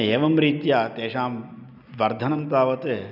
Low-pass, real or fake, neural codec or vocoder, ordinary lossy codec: 5.4 kHz; real; none; none